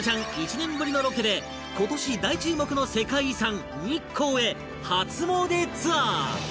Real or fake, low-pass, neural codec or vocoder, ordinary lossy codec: real; none; none; none